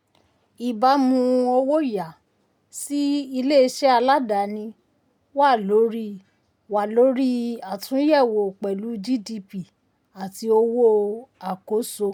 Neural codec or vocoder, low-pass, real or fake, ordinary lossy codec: none; 19.8 kHz; real; none